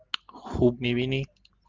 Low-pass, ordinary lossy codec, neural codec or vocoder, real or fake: 7.2 kHz; Opus, 32 kbps; none; real